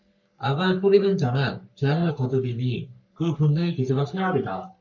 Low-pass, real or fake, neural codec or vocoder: 7.2 kHz; fake; codec, 44.1 kHz, 3.4 kbps, Pupu-Codec